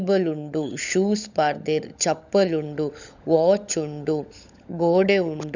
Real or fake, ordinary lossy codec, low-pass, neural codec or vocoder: fake; none; 7.2 kHz; codec, 16 kHz, 16 kbps, FunCodec, trained on LibriTTS, 50 frames a second